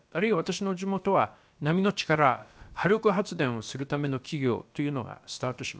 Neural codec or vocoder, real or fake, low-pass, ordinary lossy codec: codec, 16 kHz, about 1 kbps, DyCAST, with the encoder's durations; fake; none; none